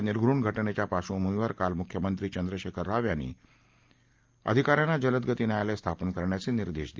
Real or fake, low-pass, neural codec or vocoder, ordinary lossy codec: real; 7.2 kHz; none; Opus, 32 kbps